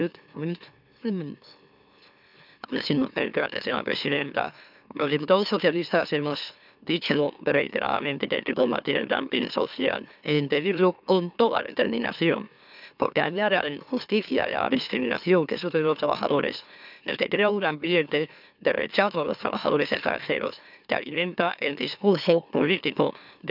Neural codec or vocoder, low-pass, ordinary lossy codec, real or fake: autoencoder, 44.1 kHz, a latent of 192 numbers a frame, MeloTTS; 5.4 kHz; none; fake